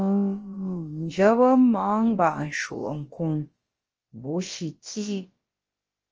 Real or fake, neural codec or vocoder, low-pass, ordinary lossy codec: fake; codec, 16 kHz, about 1 kbps, DyCAST, with the encoder's durations; 7.2 kHz; Opus, 24 kbps